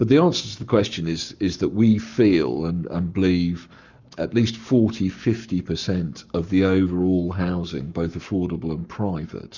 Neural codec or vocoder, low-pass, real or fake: codec, 44.1 kHz, 7.8 kbps, Pupu-Codec; 7.2 kHz; fake